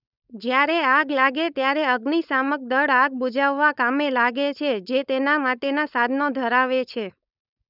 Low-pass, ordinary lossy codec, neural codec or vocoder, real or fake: 5.4 kHz; none; codec, 16 kHz, 4.8 kbps, FACodec; fake